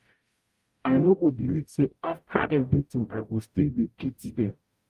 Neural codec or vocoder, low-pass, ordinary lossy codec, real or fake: codec, 44.1 kHz, 0.9 kbps, DAC; 14.4 kHz; Opus, 32 kbps; fake